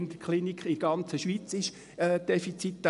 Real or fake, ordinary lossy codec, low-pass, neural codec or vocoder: real; none; 10.8 kHz; none